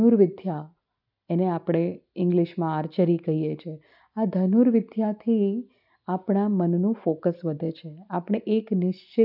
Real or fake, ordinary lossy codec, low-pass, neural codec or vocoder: real; none; 5.4 kHz; none